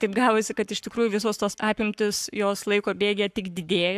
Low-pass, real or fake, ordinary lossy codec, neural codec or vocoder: 14.4 kHz; fake; AAC, 96 kbps; codec, 44.1 kHz, 7.8 kbps, Pupu-Codec